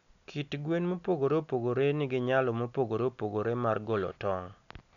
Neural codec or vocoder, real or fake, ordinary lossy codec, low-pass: none; real; none; 7.2 kHz